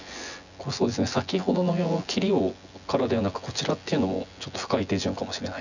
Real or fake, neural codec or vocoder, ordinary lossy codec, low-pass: fake; vocoder, 24 kHz, 100 mel bands, Vocos; none; 7.2 kHz